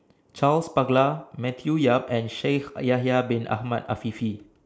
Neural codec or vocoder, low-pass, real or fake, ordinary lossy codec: none; none; real; none